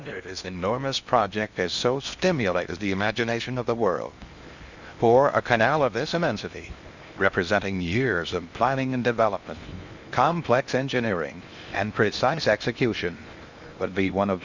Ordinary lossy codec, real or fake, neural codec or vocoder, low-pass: Opus, 64 kbps; fake; codec, 16 kHz in and 24 kHz out, 0.6 kbps, FocalCodec, streaming, 2048 codes; 7.2 kHz